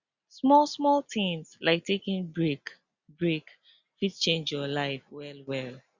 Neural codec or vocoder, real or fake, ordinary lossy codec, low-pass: none; real; Opus, 64 kbps; 7.2 kHz